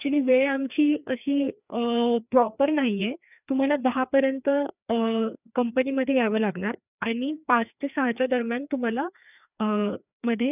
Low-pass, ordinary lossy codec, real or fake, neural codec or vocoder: 3.6 kHz; none; fake; codec, 16 kHz, 2 kbps, FreqCodec, larger model